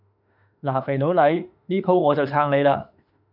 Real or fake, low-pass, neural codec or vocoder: fake; 5.4 kHz; autoencoder, 48 kHz, 32 numbers a frame, DAC-VAE, trained on Japanese speech